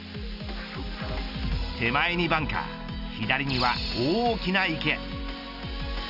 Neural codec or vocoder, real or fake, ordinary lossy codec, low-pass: none; real; none; 5.4 kHz